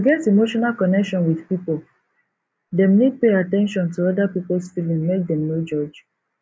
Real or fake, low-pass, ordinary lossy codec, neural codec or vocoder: real; none; none; none